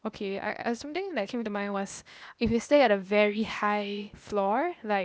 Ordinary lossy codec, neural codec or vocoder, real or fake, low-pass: none; codec, 16 kHz, 0.8 kbps, ZipCodec; fake; none